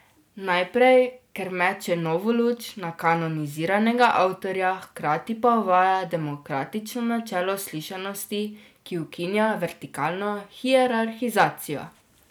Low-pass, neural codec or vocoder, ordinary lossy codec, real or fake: none; none; none; real